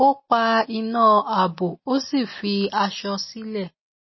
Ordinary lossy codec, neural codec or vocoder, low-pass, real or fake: MP3, 24 kbps; none; 7.2 kHz; real